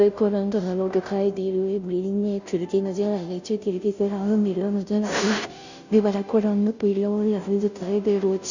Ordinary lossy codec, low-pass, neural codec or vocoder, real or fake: none; 7.2 kHz; codec, 16 kHz, 0.5 kbps, FunCodec, trained on Chinese and English, 25 frames a second; fake